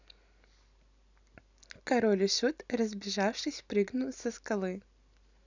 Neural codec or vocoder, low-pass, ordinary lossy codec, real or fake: none; 7.2 kHz; none; real